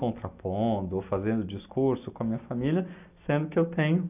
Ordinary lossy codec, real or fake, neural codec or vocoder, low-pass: none; real; none; 3.6 kHz